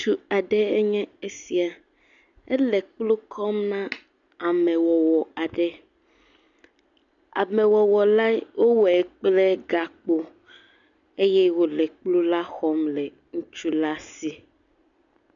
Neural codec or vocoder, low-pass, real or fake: none; 7.2 kHz; real